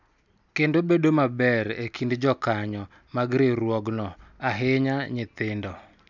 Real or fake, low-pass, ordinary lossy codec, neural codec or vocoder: real; 7.2 kHz; none; none